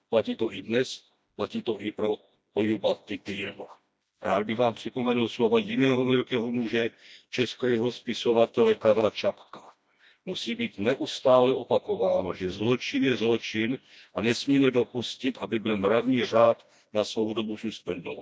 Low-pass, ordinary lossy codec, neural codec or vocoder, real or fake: none; none; codec, 16 kHz, 1 kbps, FreqCodec, smaller model; fake